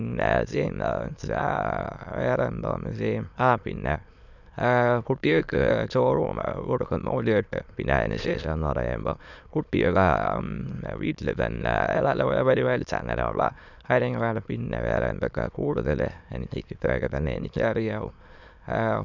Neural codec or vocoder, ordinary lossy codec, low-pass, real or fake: autoencoder, 22.05 kHz, a latent of 192 numbers a frame, VITS, trained on many speakers; none; 7.2 kHz; fake